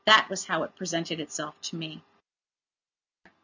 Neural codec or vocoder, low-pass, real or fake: none; 7.2 kHz; real